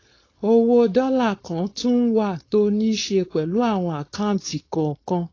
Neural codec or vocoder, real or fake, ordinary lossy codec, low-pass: codec, 16 kHz, 4.8 kbps, FACodec; fake; AAC, 32 kbps; 7.2 kHz